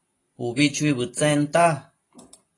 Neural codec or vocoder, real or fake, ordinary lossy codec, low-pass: none; real; AAC, 32 kbps; 10.8 kHz